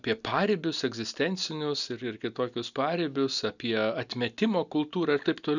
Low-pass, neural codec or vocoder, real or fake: 7.2 kHz; none; real